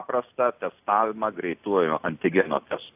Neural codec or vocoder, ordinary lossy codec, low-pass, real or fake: none; AAC, 32 kbps; 3.6 kHz; real